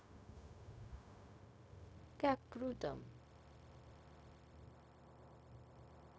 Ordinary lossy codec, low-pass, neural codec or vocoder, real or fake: none; none; codec, 16 kHz, 0.4 kbps, LongCat-Audio-Codec; fake